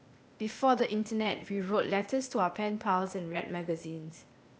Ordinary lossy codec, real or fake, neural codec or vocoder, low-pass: none; fake; codec, 16 kHz, 0.8 kbps, ZipCodec; none